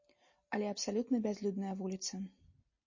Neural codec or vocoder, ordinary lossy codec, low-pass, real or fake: none; MP3, 32 kbps; 7.2 kHz; real